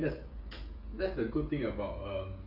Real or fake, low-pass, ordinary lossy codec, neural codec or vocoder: fake; 5.4 kHz; none; codec, 16 kHz, 16 kbps, FreqCodec, smaller model